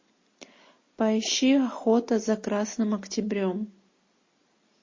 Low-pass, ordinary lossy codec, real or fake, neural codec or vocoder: 7.2 kHz; MP3, 32 kbps; real; none